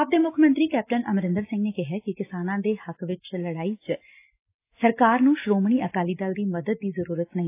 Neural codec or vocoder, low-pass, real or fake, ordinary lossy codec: none; 3.6 kHz; real; AAC, 24 kbps